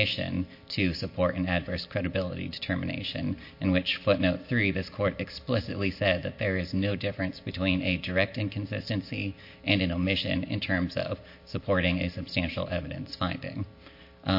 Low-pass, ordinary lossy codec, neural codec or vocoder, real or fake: 5.4 kHz; MP3, 32 kbps; none; real